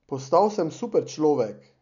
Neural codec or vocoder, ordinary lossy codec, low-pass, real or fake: none; none; 7.2 kHz; real